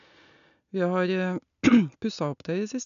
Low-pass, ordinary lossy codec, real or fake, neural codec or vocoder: 7.2 kHz; none; real; none